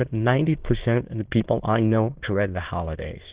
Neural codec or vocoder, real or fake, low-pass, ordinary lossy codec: autoencoder, 22.05 kHz, a latent of 192 numbers a frame, VITS, trained on many speakers; fake; 3.6 kHz; Opus, 16 kbps